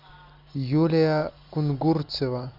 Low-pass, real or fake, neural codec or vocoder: 5.4 kHz; real; none